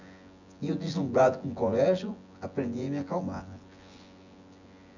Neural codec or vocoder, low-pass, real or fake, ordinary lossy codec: vocoder, 24 kHz, 100 mel bands, Vocos; 7.2 kHz; fake; none